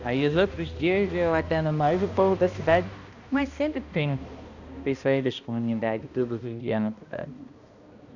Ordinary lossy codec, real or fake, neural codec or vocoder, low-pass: none; fake; codec, 16 kHz, 1 kbps, X-Codec, HuBERT features, trained on balanced general audio; 7.2 kHz